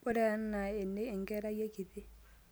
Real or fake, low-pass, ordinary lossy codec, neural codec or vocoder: real; none; none; none